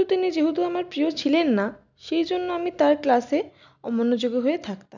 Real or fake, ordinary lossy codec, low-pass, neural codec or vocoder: real; none; 7.2 kHz; none